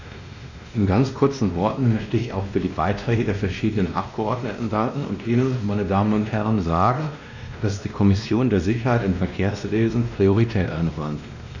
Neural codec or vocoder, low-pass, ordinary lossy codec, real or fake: codec, 16 kHz, 1 kbps, X-Codec, WavLM features, trained on Multilingual LibriSpeech; 7.2 kHz; none; fake